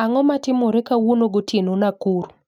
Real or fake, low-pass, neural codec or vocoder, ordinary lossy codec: real; 19.8 kHz; none; none